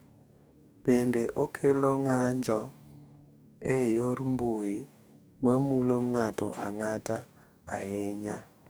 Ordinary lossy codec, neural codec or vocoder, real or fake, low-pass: none; codec, 44.1 kHz, 2.6 kbps, DAC; fake; none